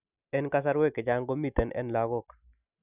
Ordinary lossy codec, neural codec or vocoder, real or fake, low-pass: none; none; real; 3.6 kHz